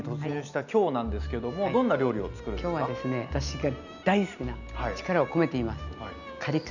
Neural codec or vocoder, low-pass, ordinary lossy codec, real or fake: none; 7.2 kHz; none; real